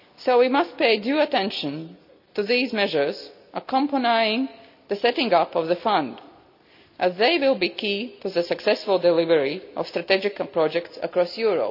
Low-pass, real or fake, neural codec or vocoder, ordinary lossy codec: 5.4 kHz; real; none; none